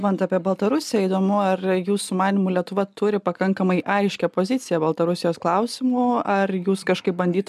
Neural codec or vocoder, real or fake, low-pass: none; real; 14.4 kHz